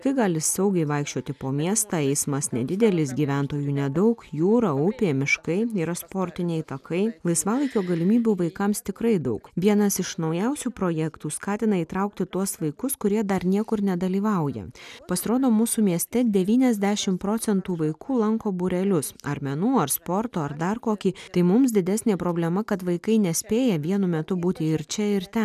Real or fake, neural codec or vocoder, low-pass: real; none; 14.4 kHz